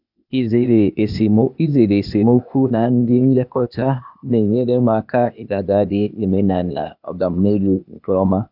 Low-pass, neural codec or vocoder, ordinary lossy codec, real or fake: 5.4 kHz; codec, 16 kHz, 0.8 kbps, ZipCodec; none; fake